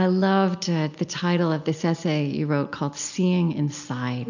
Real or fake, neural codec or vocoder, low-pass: real; none; 7.2 kHz